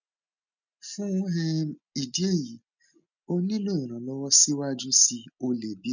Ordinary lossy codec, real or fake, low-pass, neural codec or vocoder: none; real; 7.2 kHz; none